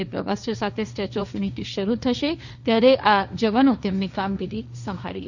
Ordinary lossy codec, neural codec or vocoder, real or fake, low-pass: none; codec, 16 kHz, 1.1 kbps, Voila-Tokenizer; fake; 7.2 kHz